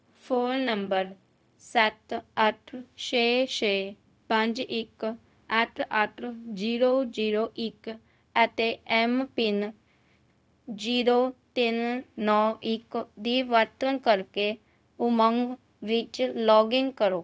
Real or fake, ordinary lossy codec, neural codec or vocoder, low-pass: fake; none; codec, 16 kHz, 0.4 kbps, LongCat-Audio-Codec; none